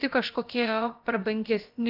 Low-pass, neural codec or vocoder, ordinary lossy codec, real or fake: 5.4 kHz; codec, 16 kHz, about 1 kbps, DyCAST, with the encoder's durations; Opus, 24 kbps; fake